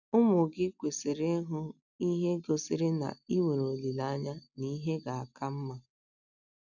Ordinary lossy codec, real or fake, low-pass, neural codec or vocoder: none; real; 7.2 kHz; none